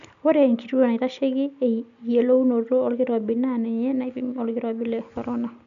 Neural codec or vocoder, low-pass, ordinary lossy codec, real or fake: none; 7.2 kHz; none; real